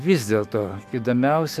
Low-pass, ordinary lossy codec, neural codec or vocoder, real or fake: 14.4 kHz; MP3, 64 kbps; autoencoder, 48 kHz, 128 numbers a frame, DAC-VAE, trained on Japanese speech; fake